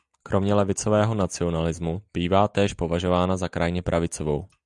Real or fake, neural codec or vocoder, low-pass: real; none; 10.8 kHz